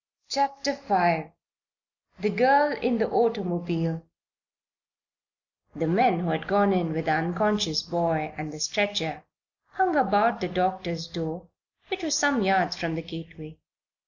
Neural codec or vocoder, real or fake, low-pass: none; real; 7.2 kHz